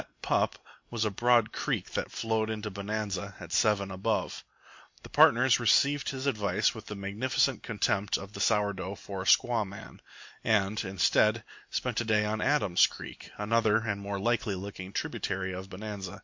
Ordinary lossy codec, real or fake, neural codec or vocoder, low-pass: MP3, 48 kbps; real; none; 7.2 kHz